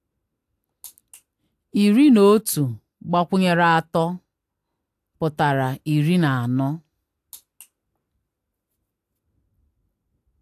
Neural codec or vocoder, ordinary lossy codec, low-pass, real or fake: none; AAC, 64 kbps; 14.4 kHz; real